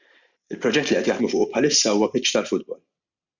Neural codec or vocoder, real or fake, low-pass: none; real; 7.2 kHz